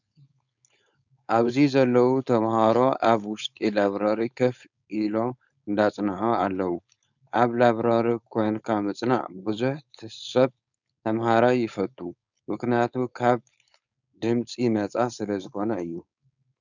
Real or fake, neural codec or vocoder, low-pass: fake; codec, 16 kHz, 4.8 kbps, FACodec; 7.2 kHz